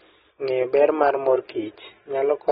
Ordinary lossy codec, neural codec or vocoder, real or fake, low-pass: AAC, 16 kbps; none; real; 7.2 kHz